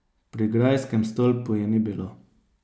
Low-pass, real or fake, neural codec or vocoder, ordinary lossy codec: none; real; none; none